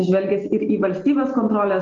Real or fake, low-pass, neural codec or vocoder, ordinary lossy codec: real; 7.2 kHz; none; Opus, 16 kbps